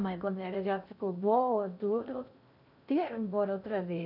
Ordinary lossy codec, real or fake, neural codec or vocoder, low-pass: none; fake; codec, 16 kHz in and 24 kHz out, 0.6 kbps, FocalCodec, streaming, 2048 codes; 5.4 kHz